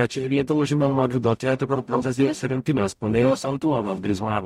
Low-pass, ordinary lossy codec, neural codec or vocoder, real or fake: 19.8 kHz; MP3, 64 kbps; codec, 44.1 kHz, 0.9 kbps, DAC; fake